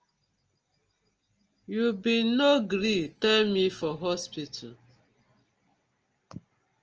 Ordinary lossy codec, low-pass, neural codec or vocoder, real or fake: Opus, 24 kbps; 7.2 kHz; none; real